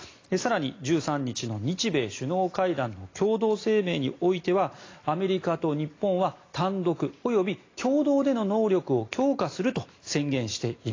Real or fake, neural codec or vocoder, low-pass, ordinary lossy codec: real; none; 7.2 kHz; AAC, 32 kbps